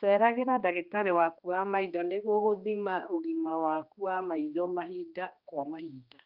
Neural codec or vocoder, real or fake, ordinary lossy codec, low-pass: codec, 16 kHz, 2 kbps, X-Codec, HuBERT features, trained on general audio; fake; Opus, 24 kbps; 5.4 kHz